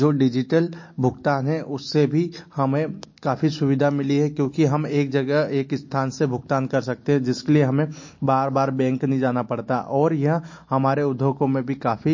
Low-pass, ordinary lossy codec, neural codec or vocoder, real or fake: 7.2 kHz; MP3, 32 kbps; none; real